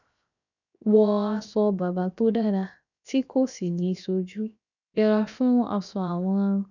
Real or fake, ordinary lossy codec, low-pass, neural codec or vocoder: fake; none; 7.2 kHz; codec, 16 kHz, 0.7 kbps, FocalCodec